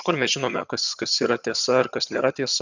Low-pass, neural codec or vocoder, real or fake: 7.2 kHz; vocoder, 22.05 kHz, 80 mel bands, HiFi-GAN; fake